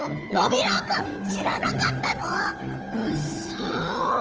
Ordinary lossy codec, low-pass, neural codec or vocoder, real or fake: Opus, 24 kbps; 7.2 kHz; codec, 16 kHz, 4 kbps, FunCodec, trained on Chinese and English, 50 frames a second; fake